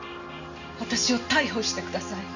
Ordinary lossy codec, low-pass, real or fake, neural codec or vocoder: none; 7.2 kHz; real; none